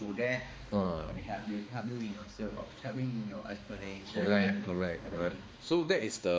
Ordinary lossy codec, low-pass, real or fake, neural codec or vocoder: none; none; fake; codec, 16 kHz, 2 kbps, X-Codec, HuBERT features, trained on balanced general audio